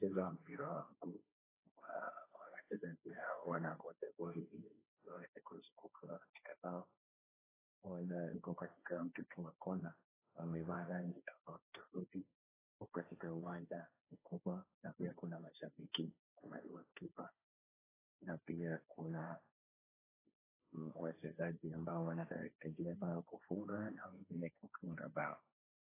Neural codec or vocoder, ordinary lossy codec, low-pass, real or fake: codec, 16 kHz, 1.1 kbps, Voila-Tokenizer; AAC, 16 kbps; 3.6 kHz; fake